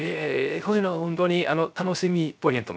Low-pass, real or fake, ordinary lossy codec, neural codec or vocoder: none; fake; none; codec, 16 kHz, 0.3 kbps, FocalCodec